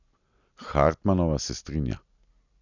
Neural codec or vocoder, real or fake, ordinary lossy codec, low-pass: none; real; none; 7.2 kHz